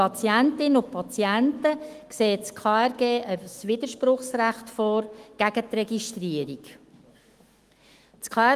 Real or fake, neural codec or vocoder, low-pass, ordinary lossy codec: fake; autoencoder, 48 kHz, 128 numbers a frame, DAC-VAE, trained on Japanese speech; 14.4 kHz; Opus, 24 kbps